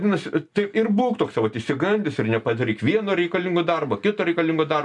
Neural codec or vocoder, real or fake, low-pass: none; real; 10.8 kHz